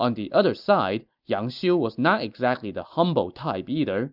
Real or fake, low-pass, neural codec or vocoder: real; 5.4 kHz; none